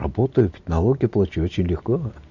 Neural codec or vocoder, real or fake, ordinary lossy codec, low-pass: none; real; none; 7.2 kHz